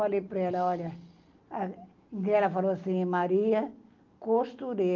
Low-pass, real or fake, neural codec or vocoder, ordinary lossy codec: 7.2 kHz; real; none; Opus, 24 kbps